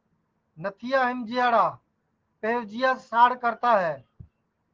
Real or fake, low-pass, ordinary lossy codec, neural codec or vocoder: real; 7.2 kHz; Opus, 16 kbps; none